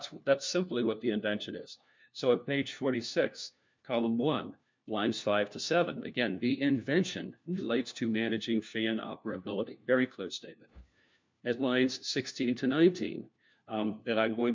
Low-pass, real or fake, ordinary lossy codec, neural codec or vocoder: 7.2 kHz; fake; MP3, 64 kbps; codec, 16 kHz, 1 kbps, FunCodec, trained on LibriTTS, 50 frames a second